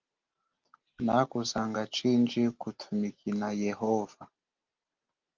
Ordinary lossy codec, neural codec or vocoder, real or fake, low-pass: Opus, 32 kbps; none; real; 7.2 kHz